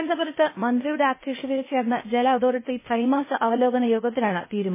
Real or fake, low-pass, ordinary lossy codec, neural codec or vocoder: fake; 3.6 kHz; MP3, 16 kbps; codec, 16 kHz, 0.8 kbps, ZipCodec